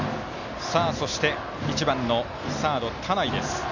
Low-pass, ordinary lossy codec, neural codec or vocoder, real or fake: 7.2 kHz; none; none; real